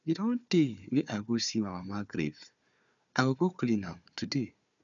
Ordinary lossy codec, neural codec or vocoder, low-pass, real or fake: none; codec, 16 kHz, 4 kbps, FunCodec, trained on Chinese and English, 50 frames a second; 7.2 kHz; fake